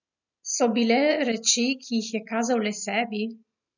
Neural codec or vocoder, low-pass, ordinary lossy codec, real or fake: none; 7.2 kHz; none; real